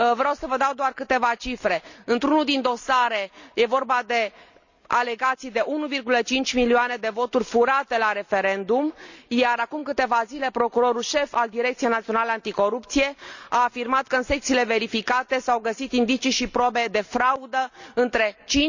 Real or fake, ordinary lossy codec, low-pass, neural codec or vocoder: real; none; 7.2 kHz; none